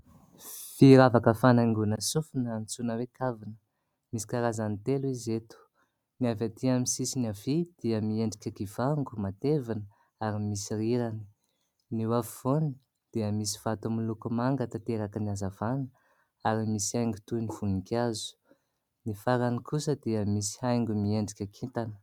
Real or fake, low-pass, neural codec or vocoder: real; 19.8 kHz; none